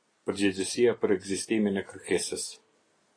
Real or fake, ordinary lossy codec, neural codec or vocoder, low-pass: real; AAC, 32 kbps; none; 9.9 kHz